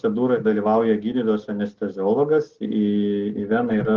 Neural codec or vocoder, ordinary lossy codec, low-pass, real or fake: none; Opus, 16 kbps; 7.2 kHz; real